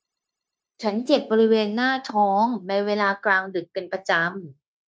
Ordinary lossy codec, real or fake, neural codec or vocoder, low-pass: none; fake; codec, 16 kHz, 0.9 kbps, LongCat-Audio-Codec; none